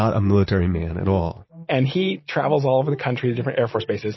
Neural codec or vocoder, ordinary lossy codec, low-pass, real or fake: none; MP3, 24 kbps; 7.2 kHz; real